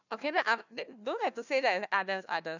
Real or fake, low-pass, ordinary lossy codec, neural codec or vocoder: fake; 7.2 kHz; none; codec, 16 kHz, 1 kbps, FunCodec, trained on Chinese and English, 50 frames a second